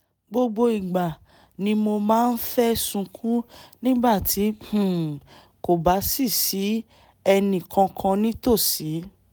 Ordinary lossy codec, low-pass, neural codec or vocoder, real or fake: none; none; none; real